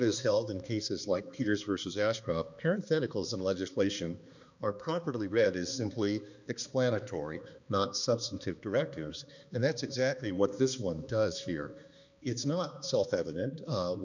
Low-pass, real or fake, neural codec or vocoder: 7.2 kHz; fake; codec, 16 kHz, 2 kbps, X-Codec, HuBERT features, trained on balanced general audio